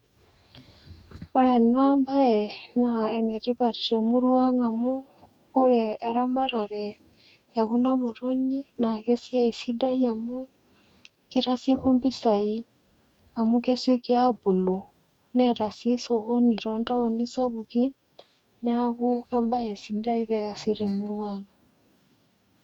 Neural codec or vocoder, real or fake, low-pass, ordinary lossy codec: codec, 44.1 kHz, 2.6 kbps, DAC; fake; 19.8 kHz; none